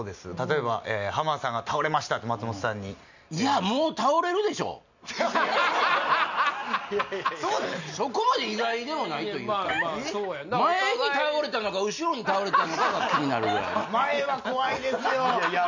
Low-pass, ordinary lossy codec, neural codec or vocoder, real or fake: 7.2 kHz; none; none; real